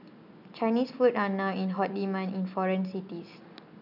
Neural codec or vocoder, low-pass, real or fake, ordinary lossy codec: none; 5.4 kHz; real; none